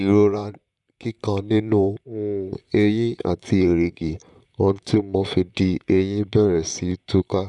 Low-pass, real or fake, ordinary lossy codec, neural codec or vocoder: 10.8 kHz; fake; none; vocoder, 44.1 kHz, 128 mel bands, Pupu-Vocoder